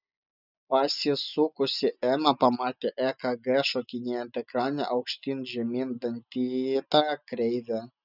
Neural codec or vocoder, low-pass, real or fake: none; 5.4 kHz; real